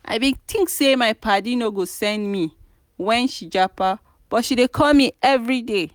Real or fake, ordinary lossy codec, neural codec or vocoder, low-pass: real; none; none; none